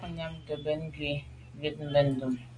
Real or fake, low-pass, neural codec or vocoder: real; 9.9 kHz; none